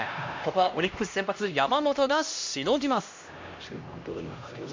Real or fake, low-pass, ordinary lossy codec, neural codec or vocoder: fake; 7.2 kHz; MP3, 48 kbps; codec, 16 kHz, 1 kbps, X-Codec, HuBERT features, trained on LibriSpeech